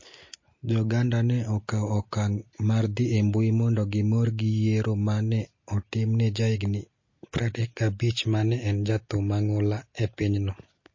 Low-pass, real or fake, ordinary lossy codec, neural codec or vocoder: 7.2 kHz; real; MP3, 32 kbps; none